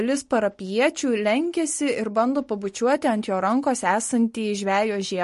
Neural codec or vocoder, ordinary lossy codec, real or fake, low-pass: none; MP3, 48 kbps; real; 14.4 kHz